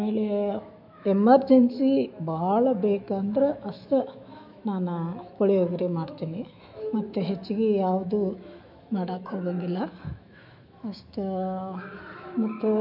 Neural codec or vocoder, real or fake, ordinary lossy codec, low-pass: autoencoder, 48 kHz, 128 numbers a frame, DAC-VAE, trained on Japanese speech; fake; MP3, 48 kbps; 5.4 kHz